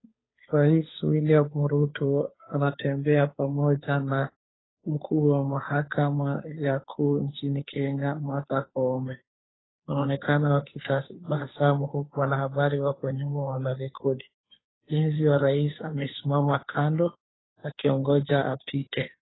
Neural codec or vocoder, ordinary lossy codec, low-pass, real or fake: codec, 16 kHz, 2 kbps, FunCodec, trained on Chinese and English, 25 frames a second; AAC, 16 kbps; 7.2 kHz; fake